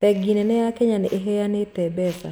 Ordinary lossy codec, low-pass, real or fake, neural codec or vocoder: none; none; real; none